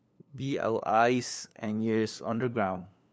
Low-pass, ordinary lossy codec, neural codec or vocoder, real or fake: none; none; codec, 16 kHz, 2 kbps, FunCodec, trained on LibriTTS, 25 frames a second; fake